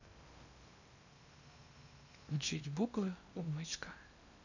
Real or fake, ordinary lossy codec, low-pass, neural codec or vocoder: fake; none; 7.2 kHz; codec, 16 kHz in and 24 kHz out, 0.8 kbps, FocalCodec, streaming, 65536 codes